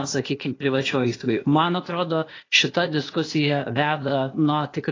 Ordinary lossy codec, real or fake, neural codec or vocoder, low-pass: AAC, 32 kbps; fake; codec, 16 kHz, 0.8 kbps, ZipCodec; 7.2 kHz